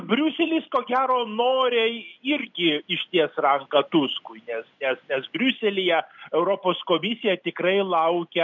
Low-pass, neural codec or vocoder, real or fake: 7.2 kHz; none; real